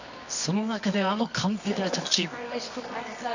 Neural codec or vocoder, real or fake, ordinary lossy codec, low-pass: codec, 24 kHz, 0.9 kbps, WavTokenizer, medium music audio release; fake; none; 7.2 kHz